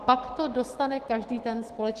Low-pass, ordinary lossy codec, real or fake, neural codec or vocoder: 14.4 kHz; Opus, 16 kbps; fake; autoencoder, 48 kHz, 128 numbers a frame, DAC-VAE, trained on Japanese speech